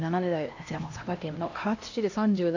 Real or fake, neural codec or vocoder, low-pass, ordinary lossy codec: fake; codec, 16 kHz, 1 kbps, X-Codec, HuBERT features, trained on LibriSpeech; 7.2 kHz; AAC, 48 kbps